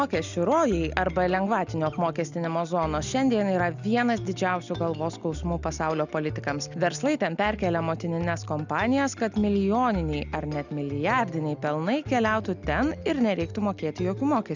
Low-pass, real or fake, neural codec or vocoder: 7.2 kHz; real; none